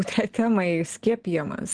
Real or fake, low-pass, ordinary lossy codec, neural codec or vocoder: real; 9.9 kHz; Opus, 16 kbps; none